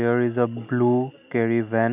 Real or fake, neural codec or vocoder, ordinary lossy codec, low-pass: real; none; none; 3.6 kHz